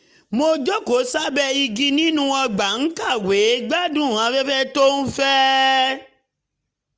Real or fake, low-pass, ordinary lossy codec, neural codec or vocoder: real; none; none; none